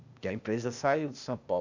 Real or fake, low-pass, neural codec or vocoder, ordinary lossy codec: fake; 7.2 kHz; codec, 16 kHz, 0.8 kbps, ZipCodec; none